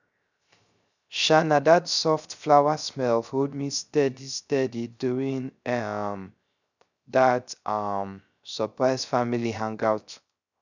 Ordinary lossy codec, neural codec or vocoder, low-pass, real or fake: none; codec, 16 kHz, 0.3 kbps, FocalCodec; 7.2 kHz; fake